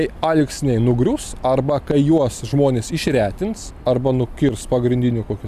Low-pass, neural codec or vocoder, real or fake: 14.4 kHz; none; real